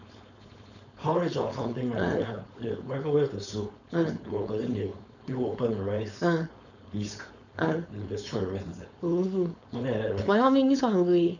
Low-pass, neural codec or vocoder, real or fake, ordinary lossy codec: 7.2 kHz; codec, 16 kHz, 4.8 kbps, FACodec; fake; none